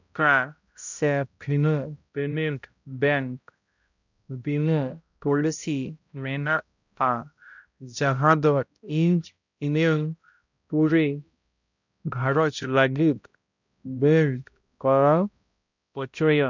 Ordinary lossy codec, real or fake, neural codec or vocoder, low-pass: none; fake; codec, 16 kHz, 0.5 kbps, X-Codec, HuBERT features, trained on balanced general audio; 7.2 kHz